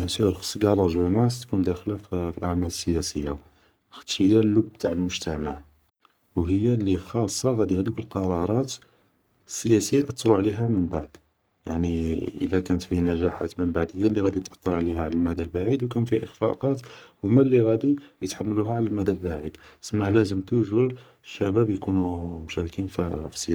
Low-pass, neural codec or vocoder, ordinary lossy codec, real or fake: none; codec, 44.1 kHz, 3.4 kbps, Pupu-Codec; none; fake